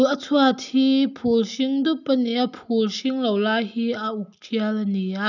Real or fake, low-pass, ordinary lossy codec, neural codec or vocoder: real; 7.2 kHz; none; none